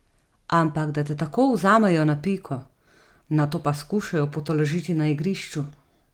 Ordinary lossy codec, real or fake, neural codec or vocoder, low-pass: Opus, 24 kbps; real; none; 19.8 kHz